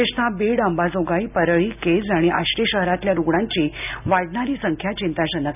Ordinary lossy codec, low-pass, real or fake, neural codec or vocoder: none; 3.6 kHz; real; none